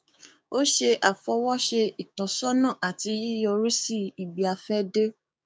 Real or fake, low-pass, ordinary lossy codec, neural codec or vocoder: fake; none; none; codec, 16 kHz, 6 kbps, DAC